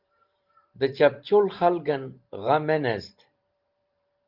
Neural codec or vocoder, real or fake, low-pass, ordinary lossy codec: vocoder, 44.1 kHz, 128 mel bands every 512 samples, BigVGAN v2; fake; 5.4 kHz; Opus, 24 kbps